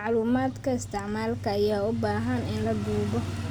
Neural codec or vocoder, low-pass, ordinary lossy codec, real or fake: none; none; none; real